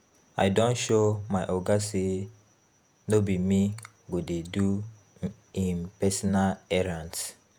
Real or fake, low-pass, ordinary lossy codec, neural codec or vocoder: fake; none; none; vocoder, 48 kHz, 128 mel bands, Vocos